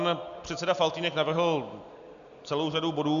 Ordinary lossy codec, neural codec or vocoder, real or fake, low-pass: MP3, 96 kbps; none; real; 7.2 kHz